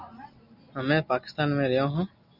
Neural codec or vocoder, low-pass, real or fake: none; 5.4 kHz; real